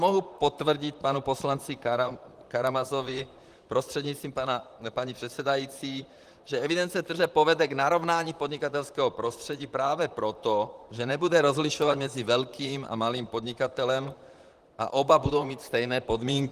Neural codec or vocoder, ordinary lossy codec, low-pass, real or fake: vocoder, 44.1 kHz, 128 mel bands, Pupu-Vocoder; Opus, 24 kbps; 14.4 kHz; fake